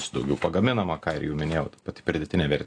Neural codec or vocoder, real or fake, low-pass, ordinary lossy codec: none; real; 9.9 kHz; AAC, 48 kbps